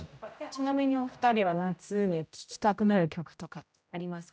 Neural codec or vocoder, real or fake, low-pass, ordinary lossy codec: codec, 16 kHz, 0.5 kbps, X-Codec, HuBERT features, trained on general audio; fake; none; none